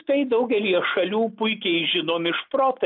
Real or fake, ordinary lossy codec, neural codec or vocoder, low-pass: real; MP3, 48 kbps; none; 5.4 kHz